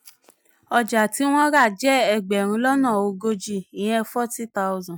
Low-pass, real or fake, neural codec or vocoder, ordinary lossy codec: none; real; none; none